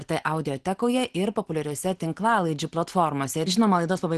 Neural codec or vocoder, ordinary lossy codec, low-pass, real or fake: none; Opus, 16 kbps; 10.8 kHz; real